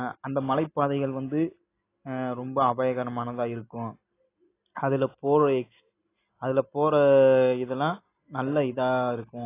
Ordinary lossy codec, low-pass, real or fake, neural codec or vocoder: AAC, 24 kbps; 3.6 kHz; real; none